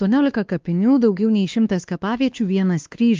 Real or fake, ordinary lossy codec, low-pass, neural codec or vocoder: fake; Opus, 32 kbps; 7.2 kHz; codec, 16 kHz, 2 kbps, X-Codec, WavLM features, trained on Multilingual LibriSpeech